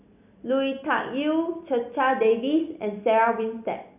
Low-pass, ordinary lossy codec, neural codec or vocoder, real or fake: 3.6 kHz; none; none; real